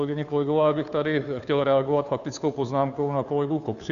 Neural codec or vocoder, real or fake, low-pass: codec, 16 kHz, 2 kbps, FunCodec, trained on Chinese and English, 25 frames a second; fake; 7.2 kHz